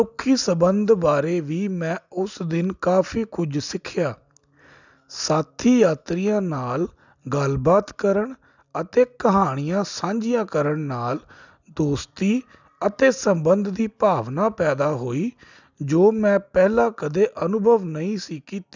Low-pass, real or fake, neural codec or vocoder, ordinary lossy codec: 7.2 kHz; real; none; none